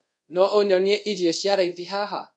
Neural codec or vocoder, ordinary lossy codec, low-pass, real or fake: codec, 24 kHz, 0.5 kbps, DualCodec; none; 10.8 kHz; fake